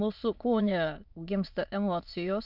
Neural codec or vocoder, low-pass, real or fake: autoencoder, 22.05 kHz, a latent of 192 numbers a frame, VITS, trained on many speakers; 5.4 kHz; fake